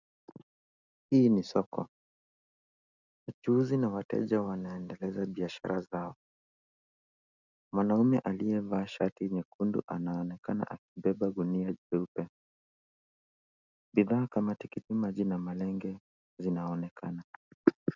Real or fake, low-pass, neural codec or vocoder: real; 7.2 kHz; none